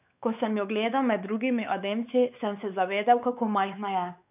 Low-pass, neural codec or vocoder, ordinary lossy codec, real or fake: 3.6 kHz; codec, 16 kHz, 4 kbps, X-Codec, WavLM features, trained on Multilingual LibriSpeech; none; fake